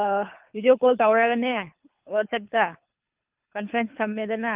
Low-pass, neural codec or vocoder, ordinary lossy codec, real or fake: 3.6 kHz; codec, 24 kHz, 3 kbps, HILCodec; Opus, 24 kbps; fake